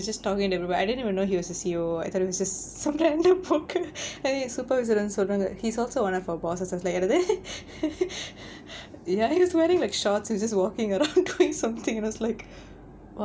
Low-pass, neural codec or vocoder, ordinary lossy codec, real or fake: none; none; none; real